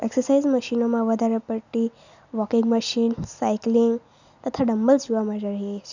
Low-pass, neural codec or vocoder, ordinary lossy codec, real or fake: 7.2 kHz; none; none; real